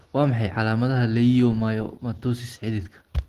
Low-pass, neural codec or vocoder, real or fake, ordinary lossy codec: 14.4 kHz; none; real; Opus, 16 kbps